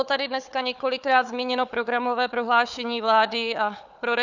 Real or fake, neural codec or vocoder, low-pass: fake; codec, 16 kHz, 4 kbps, FunCodec, trained on Chinese and English, 50 frames a second; 7.2 kHz